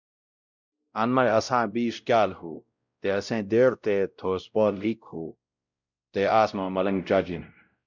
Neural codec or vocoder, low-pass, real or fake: codec, 16 kHz, 0.5 kbps, X-Codec, WavLM features, trained on Multilingual LibriSpeech; 7.2 kHz; fake